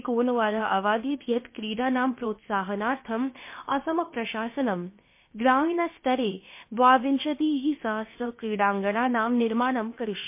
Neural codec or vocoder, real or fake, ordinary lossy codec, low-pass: codec, 16 kHz, about 1 kbps, DyCAST, with the encoder's durations; fake; MP3, 24 kbps; 3.6 kHz